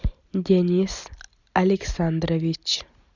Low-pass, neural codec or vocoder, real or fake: 7.2 kHz; none; real